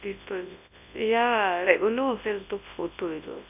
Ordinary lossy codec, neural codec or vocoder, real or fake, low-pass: none; codec, 24 kHz, 0.9 kbps, WavTokenizer, large speech release; fake; 3.6 kHz